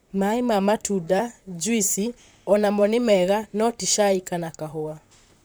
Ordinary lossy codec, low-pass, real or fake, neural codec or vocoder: none; none; fake; vocoder, 44.1 kHz, 128 mel bands, Pupu-Vocoder